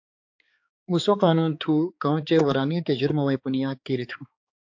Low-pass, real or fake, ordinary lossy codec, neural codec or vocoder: 7.2 kHz; fake; AAC, 48 kbps; codec, 16 kHz, 4 kbps, X-Codec, HuBERT features, trained on balanced general audio